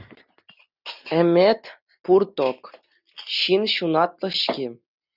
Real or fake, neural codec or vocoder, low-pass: real; none; 5.4 kHz